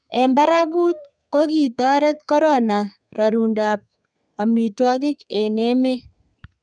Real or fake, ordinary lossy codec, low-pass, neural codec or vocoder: fake; none; 9.9 kHz; codec, 32 kHz, 1.9 kbps, SNAC